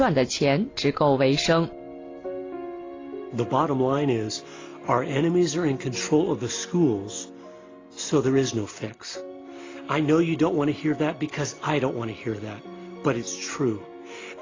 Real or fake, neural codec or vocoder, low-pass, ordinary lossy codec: real; none; 7.2 kHz; AAC, 32 kbps